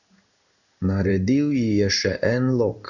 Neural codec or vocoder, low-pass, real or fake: codec, 16 kHz in and 24 kHz out, 1 kbps, XY-Tokenizer; 7.2 kHz; fake